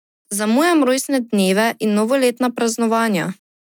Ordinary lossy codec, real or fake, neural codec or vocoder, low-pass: none; real; none; 19.8 kHz